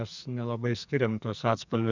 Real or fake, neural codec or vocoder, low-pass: fake; codec, 44.1 kHz, 2.6 kbps, SNAC; 7.2 kHz